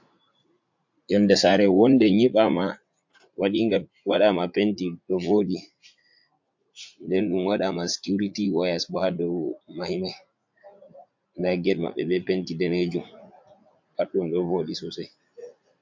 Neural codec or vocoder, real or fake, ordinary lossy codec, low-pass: vocoder, 44.1 kHz, 80 mel bands, Vocos; fake; MP3, 48 kbps; 7.2 kHz